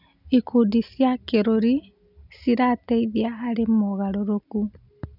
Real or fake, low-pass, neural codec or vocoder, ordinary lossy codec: real; 5.4 kHz; none; none